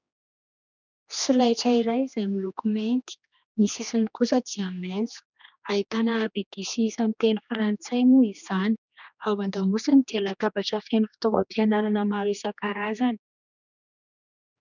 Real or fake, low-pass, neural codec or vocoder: fake; 7.2 kHz; codec, 16 kHz, 2 kbps, X-Codec, HuBERT features, trained on general audio